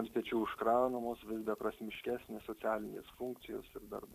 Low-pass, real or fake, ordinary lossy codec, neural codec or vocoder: 14.4 kHz; fake; AAC, 64 kbps; vocoder, 44.1 kHz, 128 mel bands every 256 samples, BigVGAN v2